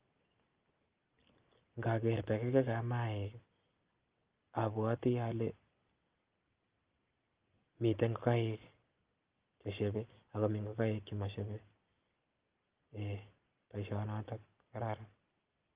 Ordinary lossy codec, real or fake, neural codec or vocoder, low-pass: Opus, 32 kbps; real; none; 3.6 kHz